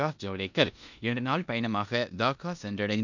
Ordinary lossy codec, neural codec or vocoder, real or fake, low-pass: none; codec, 16 kHz in and 24 kHz out, 0.9 kbps, LongCat-Audio-Codec, four codebook decoder; fake; 7.2 kHz